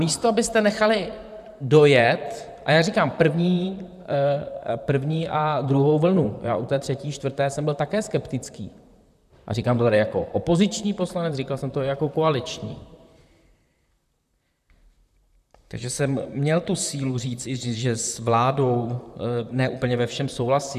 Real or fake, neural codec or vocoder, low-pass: fake; vocoder, 44.1 kHz, 128 mel bands, Pupu-Vocoder; 14.4 kHz